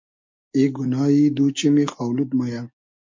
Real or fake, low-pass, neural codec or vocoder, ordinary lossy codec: real; 7.2 kHz; none; MP3, 48 kbps